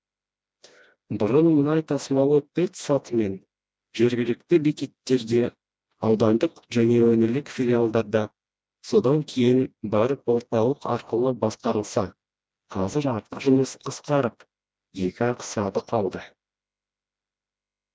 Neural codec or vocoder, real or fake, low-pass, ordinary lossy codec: codec, 16 kHz, 1 kbps, FreqCodec, smaller model; fake; none; none